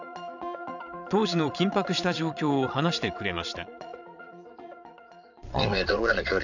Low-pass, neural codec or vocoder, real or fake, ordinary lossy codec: 7.2 kHz; vocoder, 22.05 kHz, 80 mel bands, Vocos; fake; none